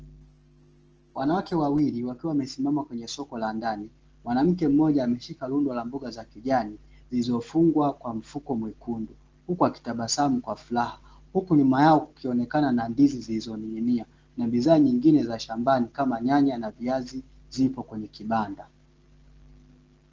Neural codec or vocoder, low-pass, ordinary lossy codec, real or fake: none; 7.2 kHz; Opus, 16 kbps; real